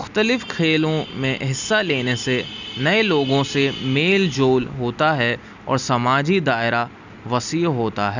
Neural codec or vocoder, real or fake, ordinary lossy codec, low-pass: none; real; none; 7.2 kHz